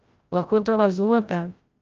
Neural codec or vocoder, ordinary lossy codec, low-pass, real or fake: codec, 16 kHz, 0.5 kbps, FreqCodec, larger model; Opus, 24 kbps; 7.2 kHz; fake